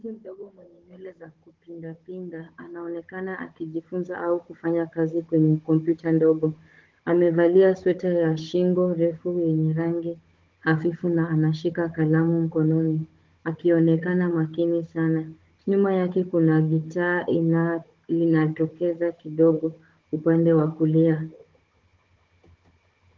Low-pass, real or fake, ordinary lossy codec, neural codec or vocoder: 7.2 kHz; fake; Opus, 16 kbps; codec, 16 kHz, 16 kbps, FunCodec, trained on Chinese and English, 50 frames a second